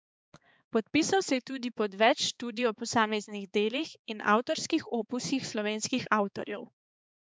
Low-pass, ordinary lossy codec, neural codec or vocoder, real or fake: none; none; codec, 16 kHz, 4 kbps, X-Codec, HuBERT features, trained on balanced general audio; fake